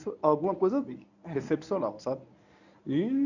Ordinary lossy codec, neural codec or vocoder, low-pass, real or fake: none; codec, 24 kHz, 0.9 kbps, WavTokenizer, medium speech release version 1; 7.2 kHz; fake